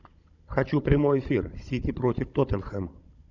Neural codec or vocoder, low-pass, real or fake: codec, 16 kHz, 16 kbps, FunCodec, trained on Chinese and English, 50 frames a second; 7.2 kHz; fake